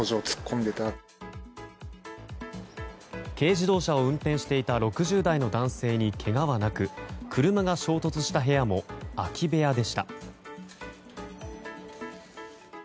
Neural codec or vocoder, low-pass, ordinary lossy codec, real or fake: none; none; none; real